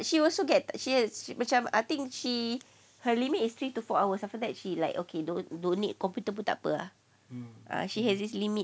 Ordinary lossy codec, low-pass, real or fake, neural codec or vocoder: none; none; real; none